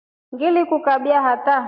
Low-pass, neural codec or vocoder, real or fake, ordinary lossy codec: 5.4 kHz; none; real; MP3, 48 kbps